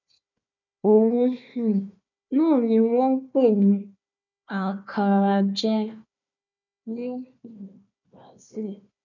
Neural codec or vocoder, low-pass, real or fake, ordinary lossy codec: codec, 16 kHz, 1 kbps, FunCodec, trained on Chinese and English, 50 frames a second; 7.2 kHz; fake; none